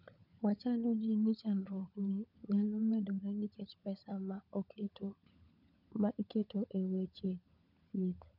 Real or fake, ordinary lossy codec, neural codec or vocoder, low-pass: fake; none; codec, 16 kHz, 16 kbps, FunCodec, trained on LibriTTS, 50 frames a second; 5.4 kHz